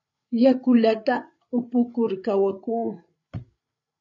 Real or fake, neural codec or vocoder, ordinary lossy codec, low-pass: fake; codec, 16 kHz, 8 kbps, FreqCodec, larger model; MP3, 64 kbps; 7.2 kHz